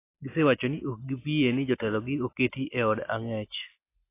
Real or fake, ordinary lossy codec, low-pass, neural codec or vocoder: real; AAC, 24 kbps; 3.6 kHz; none